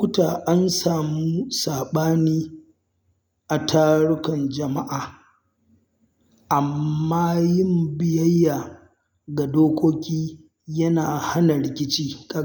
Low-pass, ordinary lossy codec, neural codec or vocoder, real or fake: none; none; none; real